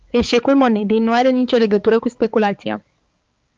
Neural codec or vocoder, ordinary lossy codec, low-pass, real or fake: codec, 16 kHz, 4 kbps, X-Codec, HuBERT features, trained on balanced general audio; Opus, 24 kbps; 7.2 kHz; fake